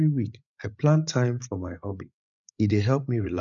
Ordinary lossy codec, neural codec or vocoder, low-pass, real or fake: none; codec, 16 kHz, 8 kbps, FreqCodec, larger model; 7.2 kHz; fake